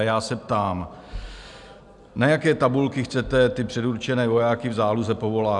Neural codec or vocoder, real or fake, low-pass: none; real; 10.8 kHz